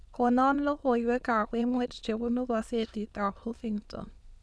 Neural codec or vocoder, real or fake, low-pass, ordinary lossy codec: autoencoder, 22.05 kHz, a latent of 192 numbers a frame, VITS, trained on many speakers; fake; none; none